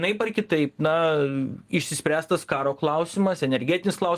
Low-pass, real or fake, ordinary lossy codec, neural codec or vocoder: 14.4 kHz; real; Opus, 24 kbps; none